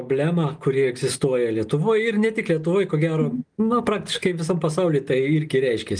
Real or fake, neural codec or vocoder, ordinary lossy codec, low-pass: real; none; Opus, 32 kbps; 9.9 kHz